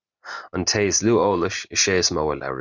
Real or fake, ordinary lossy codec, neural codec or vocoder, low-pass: real; Opus, 64 kbps; none; 7.2 kHz